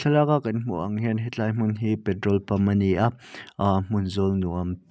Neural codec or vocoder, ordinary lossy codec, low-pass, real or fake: none; none; none; real